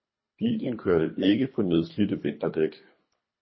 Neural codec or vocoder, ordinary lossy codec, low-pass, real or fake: codec, 24 kHz, 3 kbps, HILCodec; MP3, 24 kbps; 7.2 kHz; fake